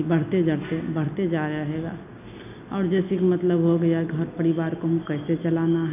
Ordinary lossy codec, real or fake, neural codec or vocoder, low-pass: AAC, 32 kbps; real; none; 3.6 kHz